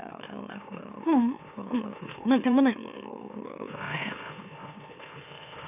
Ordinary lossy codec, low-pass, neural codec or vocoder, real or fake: none; 3.6 kHz; autoencoder, 44.1 kHz, a latent of 192 numbers a frame, MeloTTS; fake